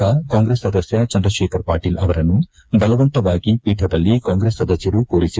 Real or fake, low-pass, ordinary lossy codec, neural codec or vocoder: fake; none; none; codec, 16 kHz, 4 kbps, FreqCodec, smaller model